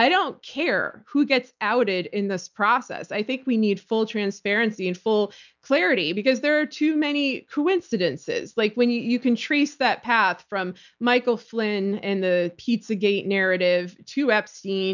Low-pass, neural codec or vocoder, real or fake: 7.2 kHz; none; real